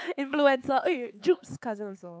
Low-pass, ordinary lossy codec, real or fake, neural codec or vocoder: none; none; fake; codec, 16 kHz, 4 kbps, X-Codec, HuBERT features, trained on LibriSpeech